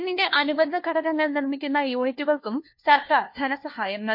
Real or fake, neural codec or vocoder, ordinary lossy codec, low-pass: fake; codec, 16 kHz, 1 kbps, FunCodec, trained on LibriTTS, 50 frames a second; MP3, 32 kbps; 5.4 kHz